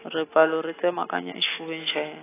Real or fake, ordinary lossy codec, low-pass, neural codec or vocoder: real; AAC, 16 kbps; 3.6 kHz; none